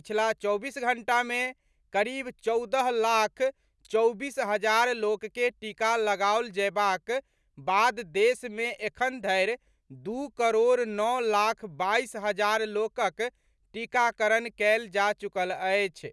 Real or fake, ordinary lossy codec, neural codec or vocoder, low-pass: real; none; none; none